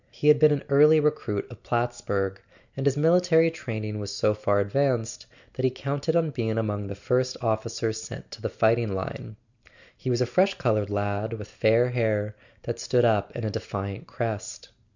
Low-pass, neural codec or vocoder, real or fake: 7.2 kHz; none; real